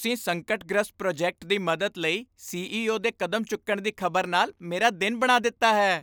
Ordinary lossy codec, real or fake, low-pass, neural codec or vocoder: none; real; none; none